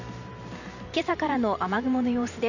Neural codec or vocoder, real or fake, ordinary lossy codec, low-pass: vocoder, 44.1 kHz, 128 mel bands every 512 samples, BigVGAN v2; fake; none; 7.2 kHz